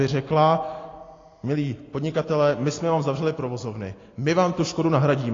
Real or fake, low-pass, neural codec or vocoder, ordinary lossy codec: real; 7.2 kHz; none; AAC, 32 kbps